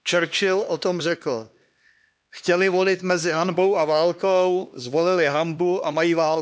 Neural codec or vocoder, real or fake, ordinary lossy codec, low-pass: codec, 16 kHz, 2 kbps, X-Codec, HuBERT features, trained on LibriSpeech; fake; none; none